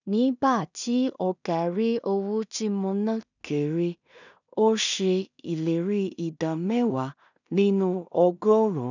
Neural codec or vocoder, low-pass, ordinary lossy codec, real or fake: codec, 16 kHz in and 24 kHz out, 0.4 kbps, LongCat-Audio-Codec, two codebook decoder; 7.2 kHz; none; fake